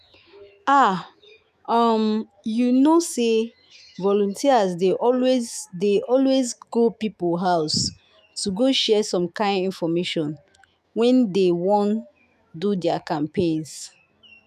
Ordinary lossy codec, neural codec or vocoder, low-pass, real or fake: none; autoencoder, 48 kHz, 128 numbers a frame, DAC-VAE, trained on Japanese speech; 14.4 kHz; fake